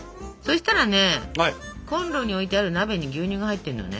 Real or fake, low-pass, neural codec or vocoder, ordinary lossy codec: real; none; none; none